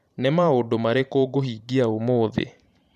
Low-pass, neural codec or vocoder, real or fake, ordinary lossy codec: 14.4 kHz; vocoder, 44.1 kHz, 128 mel bands every 512 samples, BigVGAN v2; fake; none